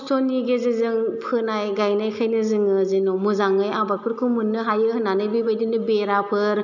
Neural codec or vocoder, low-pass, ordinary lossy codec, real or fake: none; 7.2 kHz; none; real